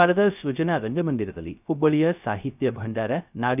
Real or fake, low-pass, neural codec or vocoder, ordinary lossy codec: fake; 3.6 kHz; codec, 16 kHz, 0.3 kbps, FocalCodec; none